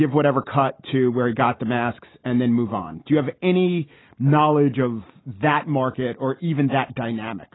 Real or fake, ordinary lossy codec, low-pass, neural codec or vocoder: real; AAC, 16 kbps; 7.2 kHz; none